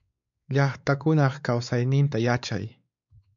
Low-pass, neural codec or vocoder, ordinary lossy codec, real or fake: 7.2 kHz; codec, 16 kHz, 4 kbps, X-Codec, WavLM features, trained on Multilingual LibriSpeech; MP3, 64 kbps; fake